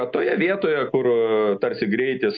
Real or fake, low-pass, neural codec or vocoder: real; 7.2 kHz; none